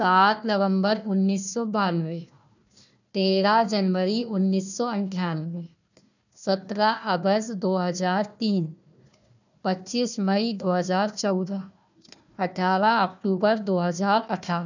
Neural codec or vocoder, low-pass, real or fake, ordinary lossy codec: codec, 16 kHz, 1 kbps, FunCodec, trained on Chinese and English, 50 frames a second; 7.2 kHz; fake; none